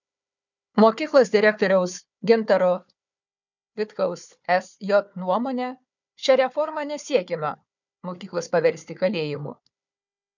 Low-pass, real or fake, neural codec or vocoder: 7.2 kHz; fake; codec, 16 kHz, 4 kbps, FunCodec, trained on Chinese and English, 50 frames a second